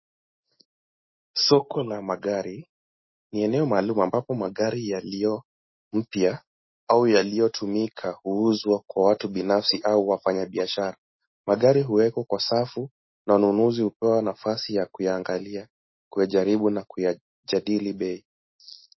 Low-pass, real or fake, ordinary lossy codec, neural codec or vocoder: 7.2 kHz; real; MP3, 24 kbps; none